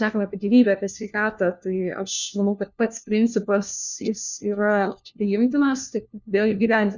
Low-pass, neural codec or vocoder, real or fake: 7.2 kHz; codec, 16 kHz, 1 kbps, FunCodec, trained on LibriTTS, 50 frames a second; fake